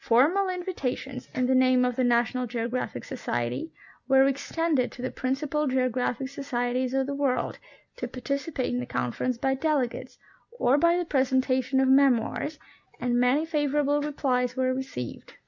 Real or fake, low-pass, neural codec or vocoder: real; 7.2 kHz; none